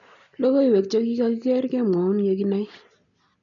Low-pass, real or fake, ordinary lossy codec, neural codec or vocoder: 7.2 kHz; real; none; none